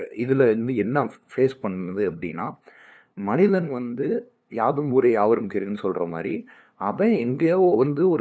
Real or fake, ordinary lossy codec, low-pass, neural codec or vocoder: fake; none; none; codec, 16 kHz, 2 kbps, FunCodec, trained on LibriTTS, 25 frames a second